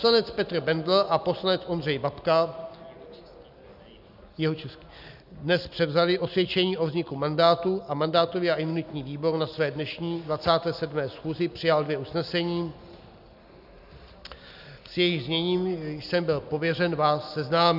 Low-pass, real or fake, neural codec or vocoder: 5.4 kHz; real; none